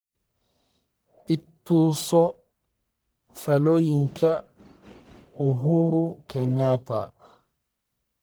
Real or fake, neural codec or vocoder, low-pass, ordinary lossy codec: fake; codec, 44.1 kHz, 1.7 kbps, Pupu-Codec; none; none